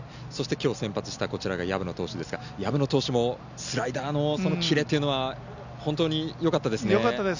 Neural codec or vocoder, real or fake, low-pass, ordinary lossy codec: none; real; 7.2 kHz; none